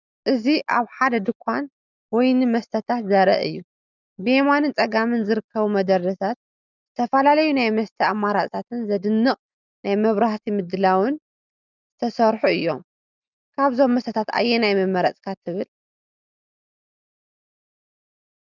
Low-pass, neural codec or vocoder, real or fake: 7.2 kHz; none; real